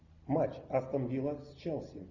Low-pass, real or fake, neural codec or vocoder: 7.2 kHz; real; none